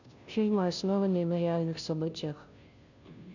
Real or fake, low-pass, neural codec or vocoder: fake; 7.2 kHz; codec, 16 kHz, 0.5 kbps, FunCodec, trained on Chinese and English, 25 frames a second